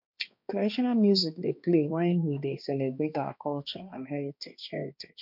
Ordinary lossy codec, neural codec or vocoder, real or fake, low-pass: MP3, 48 kbps; codec, 16 kHz, 2 kbps, X-Codec, HuBERT features, trained on balanced general audio; fake; 5.4 kHz